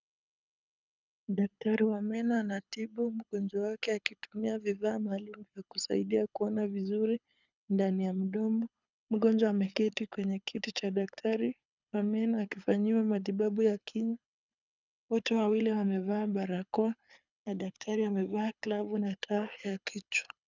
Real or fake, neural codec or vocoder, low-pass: fake; codec, 24 kHz, 6 kbps, HILCodec; 7.2 kHz